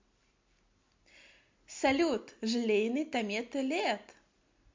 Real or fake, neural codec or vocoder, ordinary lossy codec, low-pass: real; none; MP3, 48 kbps; 7.2 kHz